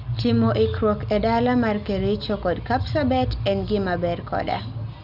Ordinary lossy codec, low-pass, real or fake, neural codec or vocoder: none; 5.4 kHz; real; none